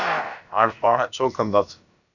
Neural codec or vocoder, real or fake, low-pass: codec, 16 kHz, about 1 kbps, DyCAST, with the encoder's durations; fake; 7.2 kHz